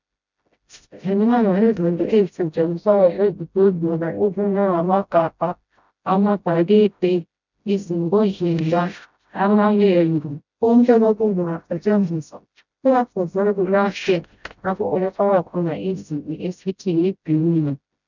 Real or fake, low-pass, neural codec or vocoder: fake; 7.2 kHz; codec, 16 kHz, 0.5 kbps, FreqCodec, smaller model